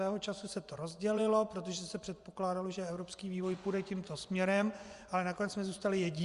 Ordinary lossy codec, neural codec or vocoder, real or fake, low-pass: Opus, 64 kbps; vocoder, 24 kHz, 100 mel bands, Vocos; fake; 10.8 kHz